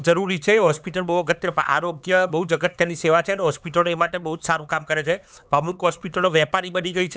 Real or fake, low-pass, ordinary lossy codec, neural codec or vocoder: fake; none; none; codec, 16 kHz, 4 kbps, X-Codec, HuBERT features, trained on LibriSpeech